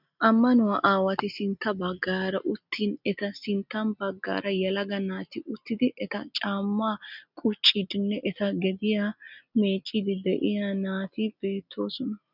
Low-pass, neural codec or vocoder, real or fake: 5.4 kHz; none; real